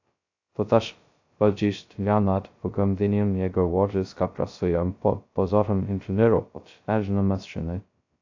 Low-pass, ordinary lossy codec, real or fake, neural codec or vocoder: 7.2 kHz; AAC, 48 kbps; fake; codec, 16 kHz, 0.2 kbps, FocalCodec